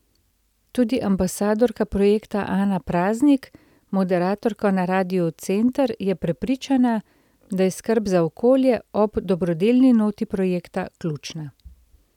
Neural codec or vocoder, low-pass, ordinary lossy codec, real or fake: none; 19.8 kHz; none; real